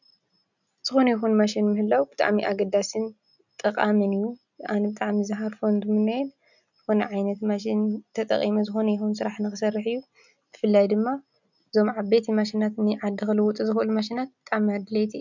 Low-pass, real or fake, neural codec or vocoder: 7.2 kHz; real; none